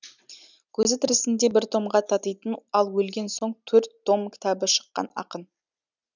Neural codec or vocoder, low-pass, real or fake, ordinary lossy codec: none; 7.2 kHz; real; none